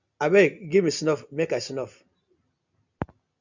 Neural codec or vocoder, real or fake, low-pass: none; real; 7.2 kHz